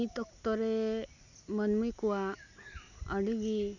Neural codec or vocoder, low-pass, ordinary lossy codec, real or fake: none; 7.2 kHz; none; real